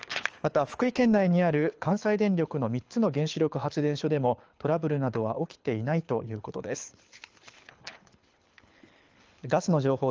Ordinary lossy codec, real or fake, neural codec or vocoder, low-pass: Opus, 24 kbps; fake; codec, 24 kHz, 6 kbps, HILCodec; 7.2 kHz